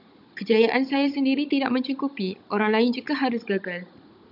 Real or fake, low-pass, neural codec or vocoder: fake; 5.4 kHz; codec, 16 kHz, 16 kbps, FunCodec, trained on Chinese and English, 50 frames a second